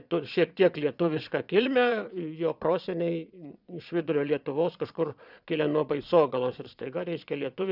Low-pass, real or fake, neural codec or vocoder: 5.4 kHz; fake; vocoder, 22.05 kHz, 80 mel bands, Vocos